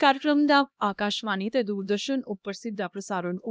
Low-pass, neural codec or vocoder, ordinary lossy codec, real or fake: none; codec, 16 kHz, 1 kbps, X-Codec, HuBERT features, trained on LibriSpeech; none; fake